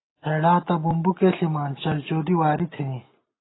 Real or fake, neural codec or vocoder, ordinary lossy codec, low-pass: real; none; AAC, 16 kbps; 7.2 kHz